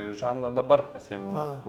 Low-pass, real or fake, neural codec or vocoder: 19.8 kHz; fake; codec, 44.1 kHz, 2.6 kbps, DAC